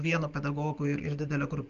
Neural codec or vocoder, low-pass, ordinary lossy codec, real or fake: none; 7.2 kHz; Opus, 32 kbps; real